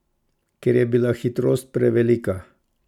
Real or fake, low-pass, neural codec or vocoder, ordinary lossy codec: real; 19.8 kHz; none; none